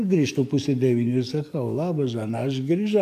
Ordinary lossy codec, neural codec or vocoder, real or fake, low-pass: AAC, 96 kbps; codec, 44.1 kHz, 7.8 kbps, DAC; fake; 14.4 kHz